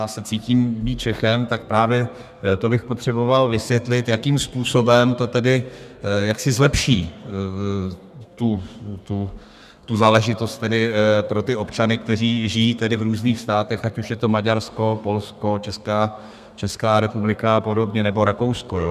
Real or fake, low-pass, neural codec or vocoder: fake; 14.4 kHz; codec, 32 kHz, 1.9 kbps, SNAC